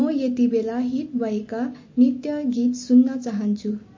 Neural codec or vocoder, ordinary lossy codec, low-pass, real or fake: none; MP3, 32 kbps; 7.2 kHz; real